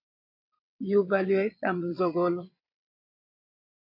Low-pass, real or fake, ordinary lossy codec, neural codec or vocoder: 5.4 kHz; fake; MP3, 32 kbps; vocoder, 22.05 kHz, 80 mel bands, WaveNeXt